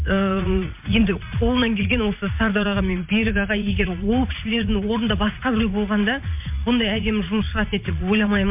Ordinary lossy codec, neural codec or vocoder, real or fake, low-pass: MP3, 32 kbps; vocoder, 44.1 kHz, 80 mel bands, Vocos; fake; 3.6 kHz